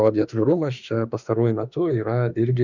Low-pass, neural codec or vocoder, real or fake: 7.2 kHz; autoencoder, 48 kHz, 32 numbers a frame, DAC-VAE, trained on Japanese speech; fake